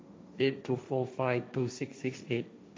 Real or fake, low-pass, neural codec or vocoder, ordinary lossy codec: fake; none; codec, 16 kHz, 1.1 kbps, Voila-Tokenizer; none